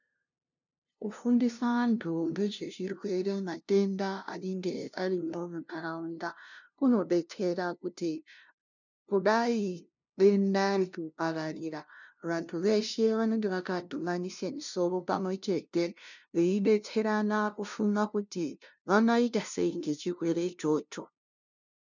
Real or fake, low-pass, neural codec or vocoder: fake; 7.2 kHz; codec, 16 kHz, 0.5 kbps, FunCodec, trained on LibriTTS, 25 frames a second